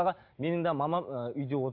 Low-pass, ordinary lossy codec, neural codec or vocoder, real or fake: 5.4 kHz; AAC, 48 kbps; none; real